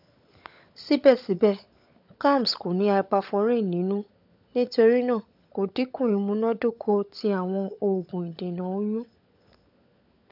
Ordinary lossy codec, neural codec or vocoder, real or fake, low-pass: AAC, 48 kbps; codec, 16 kHz, 16 kbps, FunCodec, trained on LibriTTS, 50 frames a second; fake; 5.4 kHz